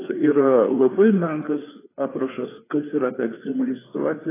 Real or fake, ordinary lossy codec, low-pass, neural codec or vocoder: fake; AAC, 16 kbps; 3.6 kHz; codec, 16 kHz, 4 kbps, FreqCodec, larger model